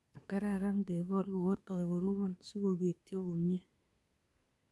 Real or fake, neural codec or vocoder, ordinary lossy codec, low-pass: fake; codec, 24 kHz, 1 kbps, SNAC; none; none